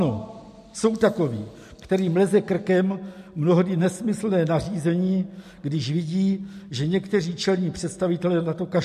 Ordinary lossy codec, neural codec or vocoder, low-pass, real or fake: MP3, 64 kbps; none; 14.4 kHz; real